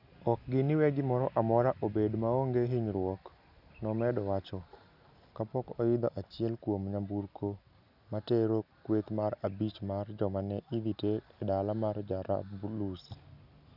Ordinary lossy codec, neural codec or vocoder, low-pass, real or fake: none; none; 5.4 kHz; real